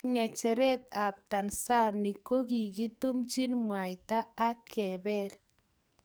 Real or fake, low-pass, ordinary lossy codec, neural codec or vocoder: fake; none; none; codec, 44.1 kHz, 2.6 kbps, SNAC